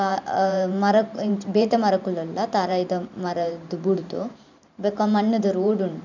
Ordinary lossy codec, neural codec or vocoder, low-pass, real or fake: none; vocoder, 44.1 kHz, 128 mel bands every 512 samples, BigVGAN v2; 7.2 kHz; fake